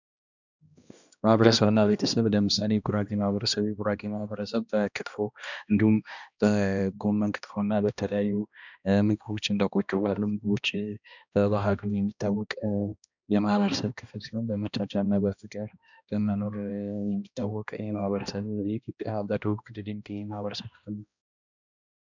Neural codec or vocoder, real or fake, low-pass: codec, 16 kHz, 1 kbps, X-Codec, HuBERT features, trained on balanced general audio; fake; 7.2 kHz